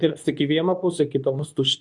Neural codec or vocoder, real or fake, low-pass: codec, 24 kHz, 0.9 kbps, WavTokenizer, medium speech release version 2; fake; 10.8 kHz